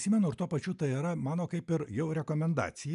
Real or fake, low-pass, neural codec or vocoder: real; 10.8 kHz; none